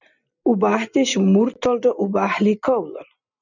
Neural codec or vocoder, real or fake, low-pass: none; real; 7.2 kHz